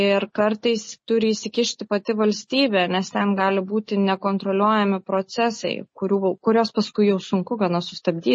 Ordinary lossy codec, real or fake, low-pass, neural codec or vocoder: MP3, 32 kbps; real; 10.8 kHz; none